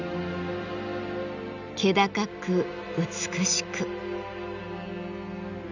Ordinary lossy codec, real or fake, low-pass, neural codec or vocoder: none; real; 7.2 kHz; none